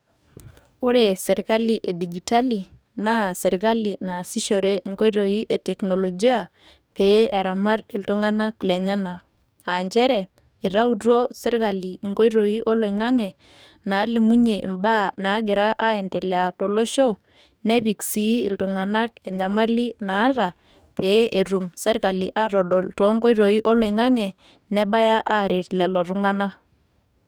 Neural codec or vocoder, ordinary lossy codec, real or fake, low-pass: codec, 44.1 kHz, 2.6 kbps, DAC; none; fake; none